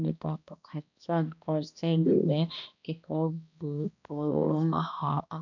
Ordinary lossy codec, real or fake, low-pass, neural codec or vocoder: none; fake; 7.2 kHz; codec, 16 kHz, 1 kbps, X-Codec, HuBERT features, trained on balanced general audio